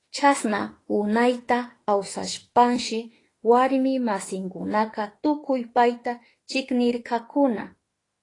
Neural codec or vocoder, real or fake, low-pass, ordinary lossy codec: autoencoder, 48 kHz, 32 numbers a frame, DAC-VAE, trained on Japanese speech; fake; 10.8 kHz; AAC, 32 kbps